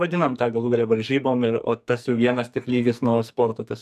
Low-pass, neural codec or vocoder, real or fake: 14.4 kHz; codec, 44.1 kHz, 2.6 kbps, SNAC; fake